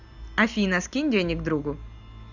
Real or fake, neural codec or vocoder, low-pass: real; none; 7.2 kHz